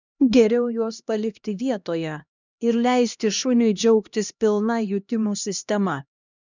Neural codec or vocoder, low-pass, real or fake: codec, 16 kHz, 1 kbps, X-Codec, HuBERT features, trained on LibriSpeech; 7.2 kHz; fake